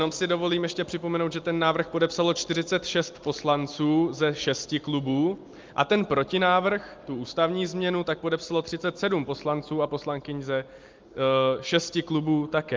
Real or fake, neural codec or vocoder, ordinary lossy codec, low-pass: real; none; Opus, 32 kbps; 7.2 kHz